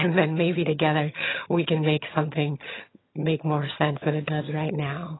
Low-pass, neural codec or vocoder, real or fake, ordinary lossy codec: 7.2 kHz; vocoder, 22.05 kHz, 80 mel bands, HiFi-GAN; fake; AAC, 16 kbps